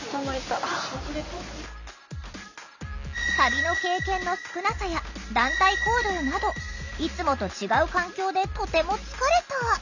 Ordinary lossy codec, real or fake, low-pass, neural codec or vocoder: none; real; 7.2 kHz; none